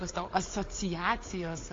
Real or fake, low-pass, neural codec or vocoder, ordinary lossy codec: fake; 7.2 kHz; codec, 16 kHz, 4 kbps, FunCodec, trained on Chinese and English, 50 frames a second; AAC, 32 kbps